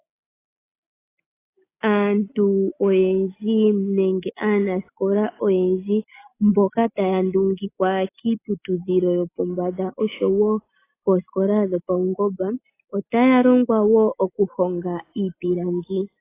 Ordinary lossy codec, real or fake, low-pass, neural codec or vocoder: AAC, 24 kbps; real; 3.6 kHz; none